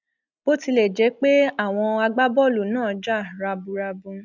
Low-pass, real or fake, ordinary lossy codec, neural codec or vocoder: 7.2 kHz; real; none; none